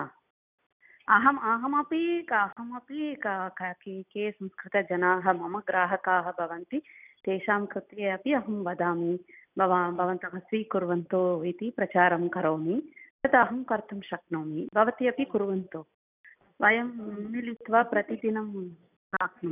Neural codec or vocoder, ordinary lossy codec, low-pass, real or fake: none; none; 3.6 kHz; real